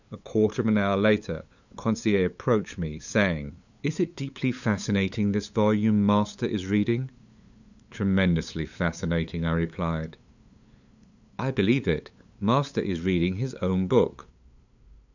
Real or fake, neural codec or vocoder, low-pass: fake; codec, 16 kHz, 8 kbps, FunCodec, trained on LibriTTS, 25 frames a second; 7.2 kHz